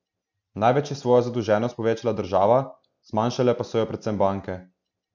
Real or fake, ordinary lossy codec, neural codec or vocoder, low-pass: real; none; none; 7.2 kHz